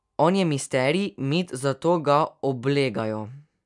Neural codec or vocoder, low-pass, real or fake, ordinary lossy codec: none; 10.8 kHz; real; MP3, 96 kbps